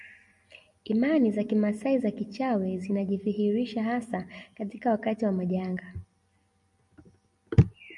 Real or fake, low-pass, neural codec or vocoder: real; 10.8 kHz; none